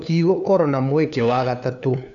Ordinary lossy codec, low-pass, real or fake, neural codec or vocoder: none; 7.2 kHz; fake; codec, 16 kHz, 2 kbps, FunCodec, trained on LibriTTS, 25 frames a second